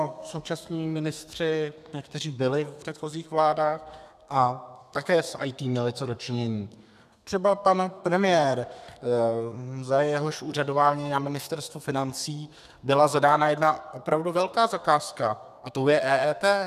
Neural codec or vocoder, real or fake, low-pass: codec, 32 kHz, 1.9 kbps, SNAC; fake; 14.4 kHz